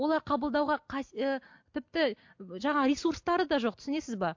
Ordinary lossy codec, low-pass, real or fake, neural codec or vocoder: MP3, 48 kbps; 7.2 kHz; real; none